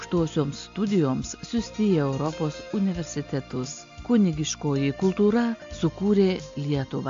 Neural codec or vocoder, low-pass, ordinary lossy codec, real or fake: none; 7.2 kHz; AAC, 64 kbps; real